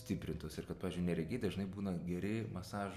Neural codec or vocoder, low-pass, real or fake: none; 14.4 kHz; real